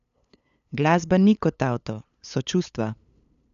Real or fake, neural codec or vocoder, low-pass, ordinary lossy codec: fake; codec, 16 kHz, 8 kbps, FunCodec, trained on LibriTTS, 25 frames a second; 7.2 kHz; none